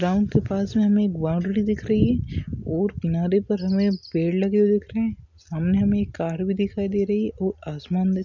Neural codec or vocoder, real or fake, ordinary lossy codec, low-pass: none; real; none; 7.2 kHz